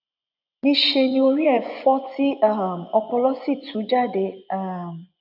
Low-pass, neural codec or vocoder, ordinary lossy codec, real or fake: 5.4 kHz; vocoder, 24 kHz, 100 mel bands, Vocos; none; fake